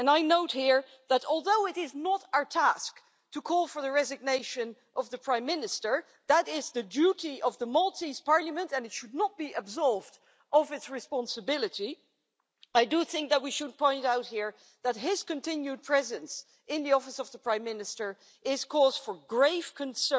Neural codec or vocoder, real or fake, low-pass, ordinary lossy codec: none; real; none; none